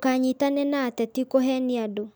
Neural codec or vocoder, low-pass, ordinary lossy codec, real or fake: none; none; none; real